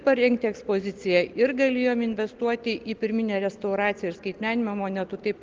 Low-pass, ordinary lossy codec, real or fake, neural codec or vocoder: 7.2 kHz; Opus, 16 kbps; real; none